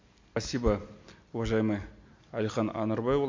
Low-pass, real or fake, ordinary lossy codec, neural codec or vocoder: 7.2 kHz; real; MP3, 48 kbps; none